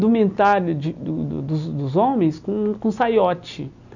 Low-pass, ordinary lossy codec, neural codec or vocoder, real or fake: 7.2 kHz; none; none; real